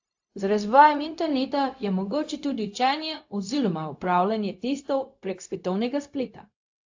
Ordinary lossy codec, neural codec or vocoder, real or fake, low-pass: AAC, 48 kbps; codec, 16 kHz, 0.4 kbps, LongCat-Audio-Codec; fake; 7.2 kHz